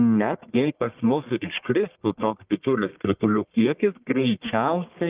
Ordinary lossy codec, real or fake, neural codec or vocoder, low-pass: Opus, 32 kbps; fake; codec, 44.1 kHz, 1.7 kbps, Pupu-Codec; 3.6 kHz